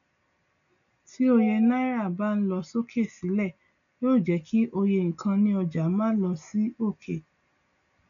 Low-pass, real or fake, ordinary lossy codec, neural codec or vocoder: 7.2 kHz; real; none; none